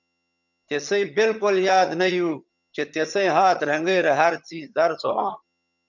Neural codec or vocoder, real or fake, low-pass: vocoder, 22.05 kHz, 80 mel bands, HiFi-GAN; fake; 7.2 kHz